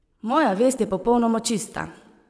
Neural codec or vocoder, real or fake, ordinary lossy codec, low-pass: vocoder, 22.05 kHz, 80 mel bands, WaveNeXt; fake; none; none